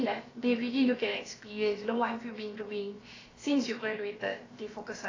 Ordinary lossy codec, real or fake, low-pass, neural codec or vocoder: AAC, 32 kbps; fake; 7.2 kHz; codec, 16 kHz, about 1 kbps, DyCAST, with the encoder's durations